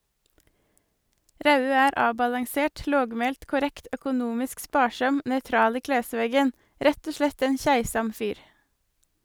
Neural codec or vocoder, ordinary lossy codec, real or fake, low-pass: none; none; real; none